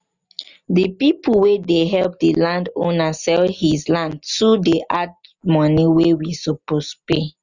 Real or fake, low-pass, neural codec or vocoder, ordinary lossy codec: real; 7.2 kHz; none; Opus, 64 kbps